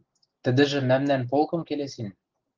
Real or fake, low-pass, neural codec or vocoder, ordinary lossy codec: real; 7.2 kHz; none; Opus, 16 kbps